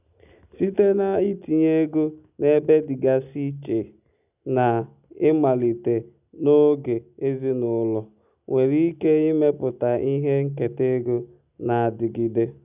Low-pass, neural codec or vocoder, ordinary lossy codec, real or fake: 3.6 kHz; none; none; real